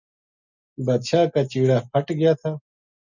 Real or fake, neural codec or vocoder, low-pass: real; none; 7.2 kHz